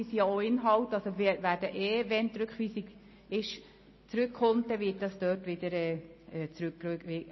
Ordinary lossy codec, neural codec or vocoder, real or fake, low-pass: MP3, 24 kbps; none; real; 7.2 kHz